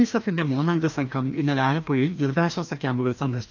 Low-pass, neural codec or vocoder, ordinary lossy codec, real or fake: 7.2 kHz; codec, 16 kHz, 1 kbps, FreqCodec, larger model; none; fake